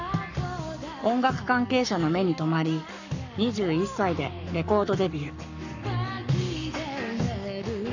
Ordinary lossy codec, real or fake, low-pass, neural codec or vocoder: none; fake; 7.2 kHz; codec, 44.1 kHz, 7.8 kbps, Pupu-Codec